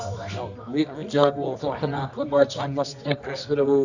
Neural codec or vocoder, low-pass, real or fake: codec, 24 kHz, 0.9 kbps, WavTokenizer, medium music audio release; 7.2 kHz; fake